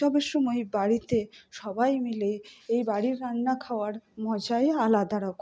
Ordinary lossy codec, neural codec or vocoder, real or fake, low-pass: none; none; real; none